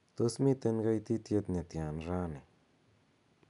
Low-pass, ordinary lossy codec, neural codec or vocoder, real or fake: 10.8 kHz; none; none; real